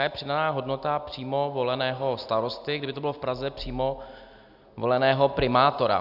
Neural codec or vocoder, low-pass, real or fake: none; 5.4 kHz; real